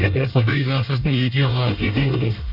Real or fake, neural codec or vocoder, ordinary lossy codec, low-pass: fake; codec, 24 kHz, 1 kbps, SNAC; none; 5.4 kHz